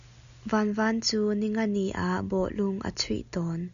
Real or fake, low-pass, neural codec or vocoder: real; 7.2 kHz; none